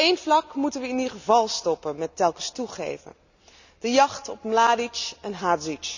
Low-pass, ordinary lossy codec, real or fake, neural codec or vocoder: 7.2 kHz; none; real; none